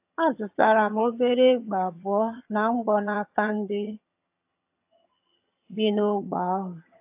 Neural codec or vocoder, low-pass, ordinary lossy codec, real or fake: vocoder, 22.05 kHz, 80 mel bands, HiFi-GAN; 3.6 kHz; AAC, 32 kbps; fake